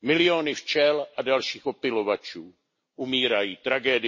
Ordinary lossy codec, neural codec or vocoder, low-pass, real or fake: MP3, 32 kbps; none; 7.2 kHz; real